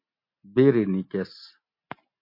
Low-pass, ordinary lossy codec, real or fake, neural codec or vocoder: 5.4 kHz; MP3, 32 kbps; real; none